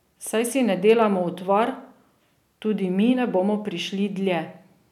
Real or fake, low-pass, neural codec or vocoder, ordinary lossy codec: real; 19.8 kHz; none; none